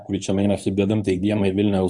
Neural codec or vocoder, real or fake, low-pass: codec, 24 kHz, 0.9 kbps, WavTokenizer, medium speech release version 1; fake; 10.8 kHz